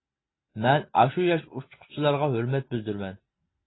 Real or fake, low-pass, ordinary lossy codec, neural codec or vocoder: real; 7.2 kHz; AAC, 16 kbps; none